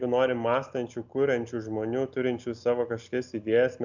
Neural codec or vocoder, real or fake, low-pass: none; real; 7.2 kHz